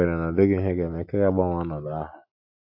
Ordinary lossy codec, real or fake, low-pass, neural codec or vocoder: AAC, 48 kbps; real; 5.4 kHz; none